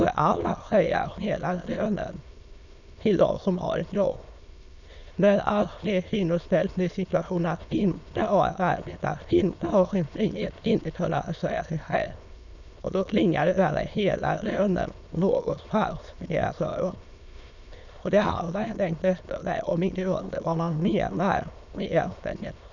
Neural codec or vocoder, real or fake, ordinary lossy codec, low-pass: autoencoder, 22.05 kHz, a latent of 192 numbers a frame, VITS, trained on many speakers; fake; none; 7.2 kHz